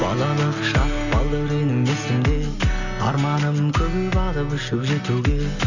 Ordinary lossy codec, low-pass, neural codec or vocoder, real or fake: none; 7.2 kHz; none; real